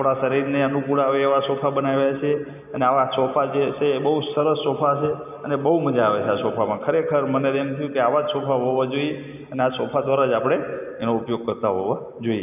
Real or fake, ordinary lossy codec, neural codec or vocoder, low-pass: real; none; none; 3.6 kHz